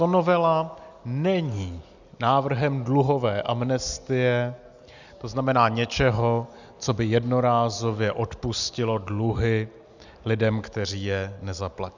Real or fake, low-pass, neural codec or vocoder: real; 7.2 kHz; none